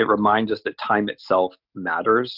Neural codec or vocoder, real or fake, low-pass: none; real; 5.4 kHz